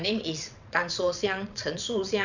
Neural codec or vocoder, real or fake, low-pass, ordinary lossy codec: vocoder, 22.05 kHz, 80 mel bands, WaveNeXt; fake; 7.2 kHz; none